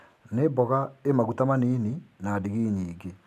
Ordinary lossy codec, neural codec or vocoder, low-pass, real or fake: AAC, 96 kbps; none; 14.4 kHz; real